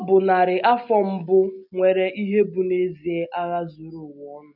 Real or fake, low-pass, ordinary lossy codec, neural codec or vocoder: real; 5.4 kHz; none; none